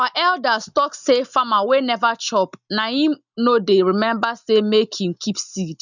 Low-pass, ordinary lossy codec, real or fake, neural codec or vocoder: 7.2 kHz; none; real; none